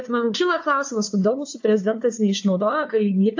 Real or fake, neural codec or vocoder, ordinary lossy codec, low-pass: fake; codec, 16 kHz, 2 kbps, FunCodec, trained on LibriTTS, 25 frames a second; AAC, 48 kbps; 7.2 kHz